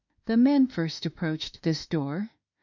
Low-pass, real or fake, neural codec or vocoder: 7.2 kHz; fake; autoencoder, 48 kHz, 32 numbers a frame, DAC-VAE, trained on Japanese speech